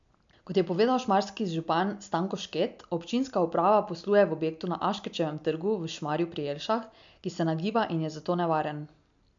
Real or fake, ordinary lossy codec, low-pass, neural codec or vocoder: real; MP3, 64 kbps; 7.2 kHz; none